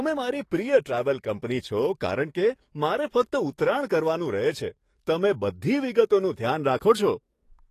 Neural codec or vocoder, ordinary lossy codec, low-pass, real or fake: codec, 44.1 kHz, 7.8 kbps, DAC; AAC, 48 kbps; 14.4 kHz; fake